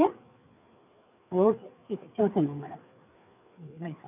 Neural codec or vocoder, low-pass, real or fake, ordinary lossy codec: codec, 16 kHz, 4 kbps, FreqCodec, larger model; 3.6 kHz; fake; none